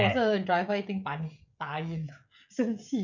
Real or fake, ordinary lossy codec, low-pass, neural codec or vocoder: fake; none; 7.2 kHz; codec, 16 kHz, 16 kbps, FreqCodec, smaller model